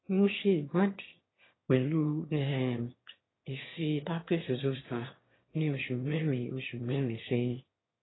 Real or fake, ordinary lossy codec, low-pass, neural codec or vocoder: fake; AAC, 16 kbps; 7.2 kHz; autoencoder, 22.05 kHz, a latent of 192 numbers a frame, VITS, trained on one speaker